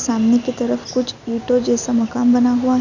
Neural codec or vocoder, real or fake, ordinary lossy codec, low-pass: none; real; none; 7.2 kHz